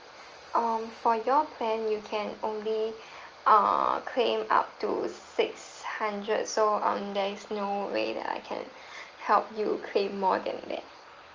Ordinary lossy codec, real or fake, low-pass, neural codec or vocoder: Opus, 24 kbps; real; 7.2 kHz; none